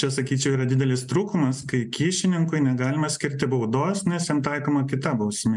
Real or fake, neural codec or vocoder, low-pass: real; none; 10.8 kHz